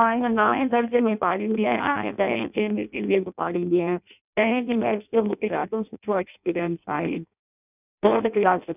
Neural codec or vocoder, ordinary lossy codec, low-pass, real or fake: codec, 16 kHz in and 24 kHz out, 0.6 kbps, FireRedTTS-2 codec; none; 3.6 kHz; fake